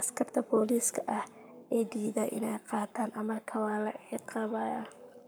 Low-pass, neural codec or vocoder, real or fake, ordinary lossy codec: none; codec, 44.1 kHz, 7.8 kbps, Pupu-Codec; fake; none